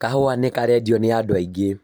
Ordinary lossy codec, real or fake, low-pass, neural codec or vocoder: none; fake; none; vocoder, 44.1 kHz, 128 mel bands every 512 samples, BigVGAN v2